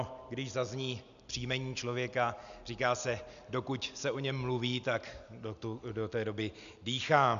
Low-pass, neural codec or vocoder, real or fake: 7.2 kHz; none; real